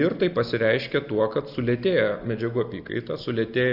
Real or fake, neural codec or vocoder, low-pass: real; none; 5.4 kHz